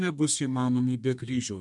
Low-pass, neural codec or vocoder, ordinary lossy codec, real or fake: 10.8 kHz; codec, 32 kHz, 1.9 kbps, SNAC; MP3, 96 kbps; fake